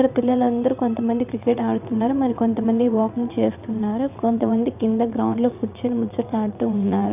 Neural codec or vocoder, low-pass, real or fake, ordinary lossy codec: vocoder, 22.05 kHz, 80 mel bands, WaveNeXt; 3.6 kHz; fake; none